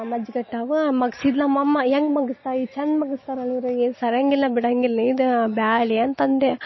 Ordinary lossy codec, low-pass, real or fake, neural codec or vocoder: MP3, 24 kbps; 7.2 kHz; real; none